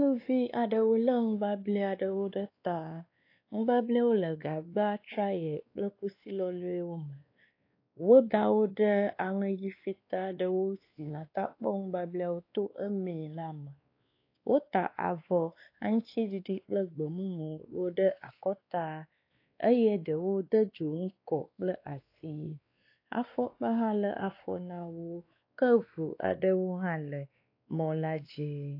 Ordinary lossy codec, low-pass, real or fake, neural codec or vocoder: AAC, 32 kbps; 5.4 kHz; fake; codec, 16 kHz, 2 kbps, X-Codec, WavLM features, trained on Multilingual LibriSpeech